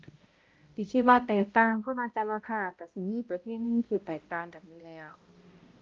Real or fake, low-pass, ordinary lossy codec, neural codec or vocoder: fake; 7.2 kHz; Opus, 24 kbps; codec, 16 kHz, 0.5 kbps, X-Codec, HuBERT features, trained on balanced general audio